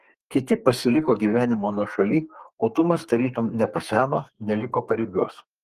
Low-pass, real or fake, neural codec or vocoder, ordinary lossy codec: 14.4 kHz; fake; codec, 32 kHz, 1.9 kbps, SNAC; Opus, 24 kbps